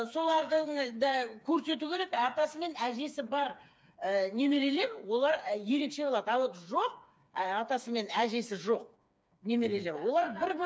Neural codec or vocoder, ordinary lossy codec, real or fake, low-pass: codec, 16 kHz, 4 kbps, FreqCodec, smaller model; none; fake; none